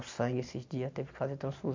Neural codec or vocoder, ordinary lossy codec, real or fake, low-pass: none; MP3, 64 kbps; real; 7.2 kHz